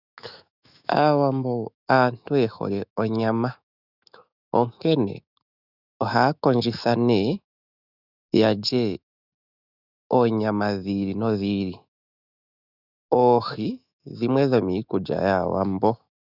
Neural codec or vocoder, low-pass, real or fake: autoencoder, 48 kHz, 128 numbers a frame, DAC-VAE, trained on Japanese speech; 5.4 kHz; fake